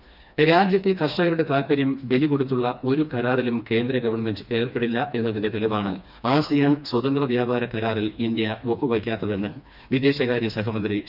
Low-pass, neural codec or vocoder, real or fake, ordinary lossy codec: 5.4 kHz; codec, 16 kHz, 2 kbps, FreqCodec, smaller model; fake; none